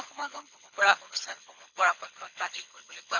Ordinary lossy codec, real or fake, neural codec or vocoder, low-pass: none; fake; codec, 24 kHz, 6 kbps, HILCodec; 7.2 kHz